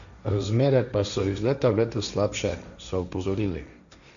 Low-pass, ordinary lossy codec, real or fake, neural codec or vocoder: 7.2 kHz; none; fake; codec, 16 kHz, 1.1 kbps, Voila-Tokenizer